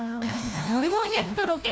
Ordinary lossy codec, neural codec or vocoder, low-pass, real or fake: none; codec, 16 kHz, 1 kbps, FunCodec, trained on LibriTTS, 50 frames a second; none; fake